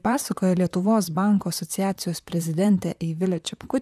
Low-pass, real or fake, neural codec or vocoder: 14.4 kHz; fake; vocoder, 44.1 kHz, 128 mel bands, Pupu-Vocoder